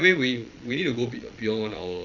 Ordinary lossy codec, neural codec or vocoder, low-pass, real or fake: none; vocoder, 22.05 kHz, 80 mel bands, Vocos; 7.2 kHz; fake